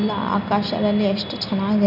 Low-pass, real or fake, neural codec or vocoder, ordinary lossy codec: 5.4 kHz; real; none; none